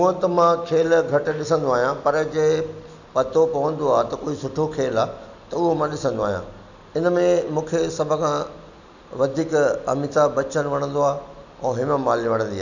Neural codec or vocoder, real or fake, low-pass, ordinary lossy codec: none; real; 7.2 kHz; none